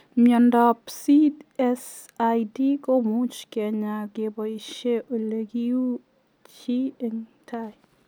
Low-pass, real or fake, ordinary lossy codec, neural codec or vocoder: none; real; none; none